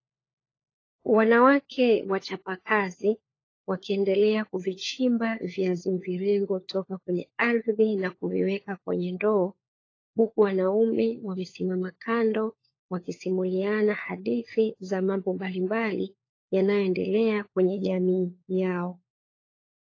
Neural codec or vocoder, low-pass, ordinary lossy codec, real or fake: codec, 16 kHz, 4 kbps, FunCodec, trained on LibriTTS, 50 frames a second; 7.2 kHz; AAC, 32 kbps; fake